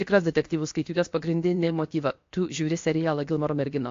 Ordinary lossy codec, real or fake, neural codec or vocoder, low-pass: AAC, 48 kbps; fake; codec, 16 kHz, 0.7 kbps, FocalCodec; 7.2 kHz